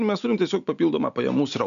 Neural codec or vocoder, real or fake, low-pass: none; real; 7.2 kHz